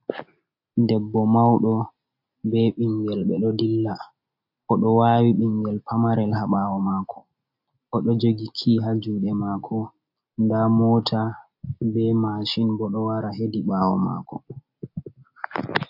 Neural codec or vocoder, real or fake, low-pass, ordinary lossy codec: none; real; 5.4 kHz; AAC, 48 kbps